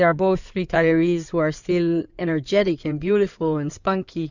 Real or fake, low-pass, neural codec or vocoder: fake; 7.2 kHz; codec, 16 kHz in and 24 kHz out, 2.2 kbps, FireRedTTS-2 codec